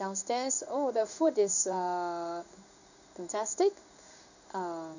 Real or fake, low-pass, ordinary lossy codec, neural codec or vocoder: fake; 7.2 kHz; none; codec, 16 kHz in and 24 kHz out, 1 kbps, XY-Tokenizer